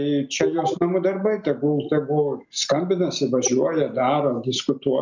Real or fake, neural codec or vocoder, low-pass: real; none; 7.2 kHz